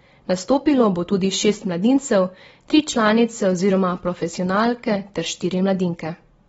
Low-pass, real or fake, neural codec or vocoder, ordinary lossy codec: 14.4 kHz; real; none; AAC, 24 kbps